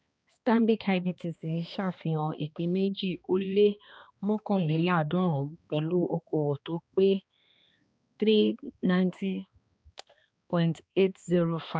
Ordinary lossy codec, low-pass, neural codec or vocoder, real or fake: none; none; codec, 16 kHz, 2 kbps, X-Codec, HuBERT features, trained on balanced general audio; fake